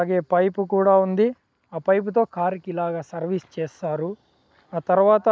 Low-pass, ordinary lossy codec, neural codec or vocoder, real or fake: none; none; none; real